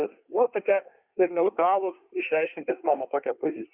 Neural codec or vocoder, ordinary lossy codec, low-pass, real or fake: codec, 24 kHz, 1 kbps, SNAC; Opus, 64 kbps; 3.6 kHz; fake